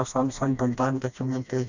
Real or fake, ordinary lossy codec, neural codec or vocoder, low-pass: fake; none; codec, 16 kHz, 1 kbps, FreqCodec, smaller model; 7.2 kHz